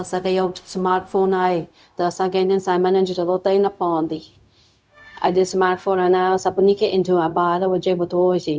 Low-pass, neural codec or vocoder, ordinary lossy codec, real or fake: none; codec, 16 kHz, 0.4 kbps, LongCat-Audio-Codec; none; fake